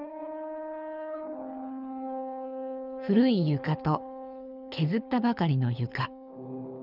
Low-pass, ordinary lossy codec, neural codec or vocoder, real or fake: 5.4 kHz; none; codec, 24 kHz, 6 kbps, HILCodec; fake